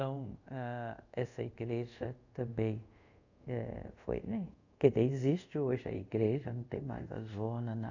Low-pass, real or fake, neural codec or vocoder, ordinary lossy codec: 7.2 kHz; fake; codec, 24 kHz, 0.5 kbps, DualCodec; none